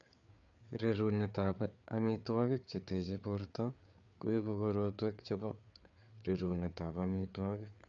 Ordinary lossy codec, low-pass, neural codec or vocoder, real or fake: none; 7.2 kHz; codec, 16 kHz, 4 kbps, FreqCodec, larger model; fake